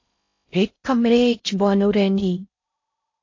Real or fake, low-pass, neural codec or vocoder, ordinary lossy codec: fake; 7.2 kHz; codec, 16 kHz in and 24 kHz out, 0.6 kbps, FocalCodec, streaming, 4096 codes; AAC, 48 kbps